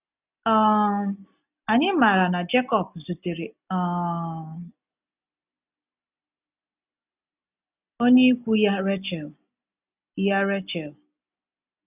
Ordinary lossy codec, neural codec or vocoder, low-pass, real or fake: none; none; 3.6 kHz; real